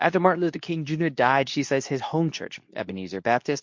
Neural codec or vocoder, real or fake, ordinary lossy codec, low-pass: codec, 24 kHz, 0.9 kbps, WavTokenizer, medium speech release version 2; fake; MP3, 48 kbps; 7.2 kHz